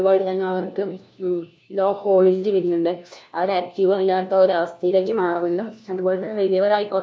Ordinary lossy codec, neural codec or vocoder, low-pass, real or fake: none; codec, 16 kHz, 1 kbps, FunCodec, trained on LibriTTS, 50 frames a second; none; fake